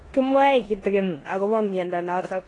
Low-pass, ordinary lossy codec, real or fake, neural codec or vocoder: 10.8 kHz; AAC, 32 kbps; fake; codec, 16 kHz in and 24 kHz out, 0.9 kbps, LongCat-Audio-Codec, four codebook decoder